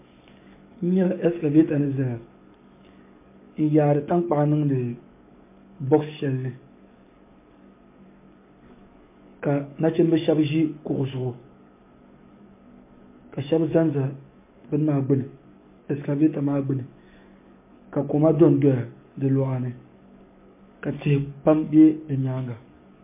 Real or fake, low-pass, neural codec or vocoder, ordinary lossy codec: fake; 3.6 kHz; codec, 44.1 kHz, 7.8 kbps, DAC; MP3, 24 kbps